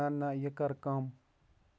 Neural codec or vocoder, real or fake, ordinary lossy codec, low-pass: none; real; none; none